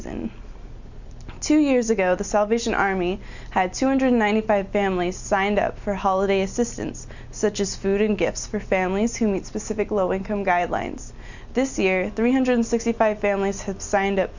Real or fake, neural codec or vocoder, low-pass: real; none; 7.2 kHz